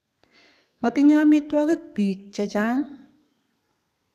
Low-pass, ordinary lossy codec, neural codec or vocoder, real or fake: 14.4 kHz; none; codec, 32 kHz, 1.9 kbps, SNAC; fake